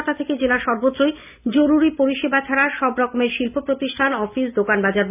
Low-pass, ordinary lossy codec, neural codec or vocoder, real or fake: 3.6 kHz; none; none; real